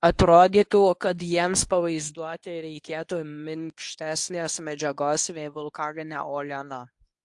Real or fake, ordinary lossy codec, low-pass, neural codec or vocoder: fake; AAC, 64 kbps; 10.8 kHz; codec, 24 kHz, 0.9 kbps, WavTokenizer, medium speech release version 1